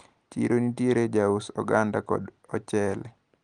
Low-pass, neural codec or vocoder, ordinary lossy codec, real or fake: 9.9 kHz; none; Opus, 24 kbps; real